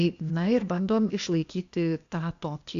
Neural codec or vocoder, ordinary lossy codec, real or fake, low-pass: codec, 16 kHz, 0.8 kbps, ZipCodec; Opus, 64 kbps; fake; 7.2 kHz